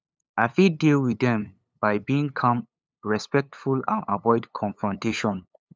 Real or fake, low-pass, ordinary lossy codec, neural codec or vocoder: fake; none; none; codec, 16 kHz, 8 kbps, FunCodec, trained on LibriTTS, 25 frames a second